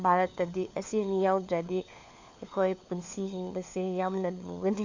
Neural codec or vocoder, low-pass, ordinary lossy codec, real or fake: codec, 16 kHz, 4 kbps, FunCodec, trained on LibriTTS, 50 frames a second; 7.2 kHz; AAC, 48 kbps; fake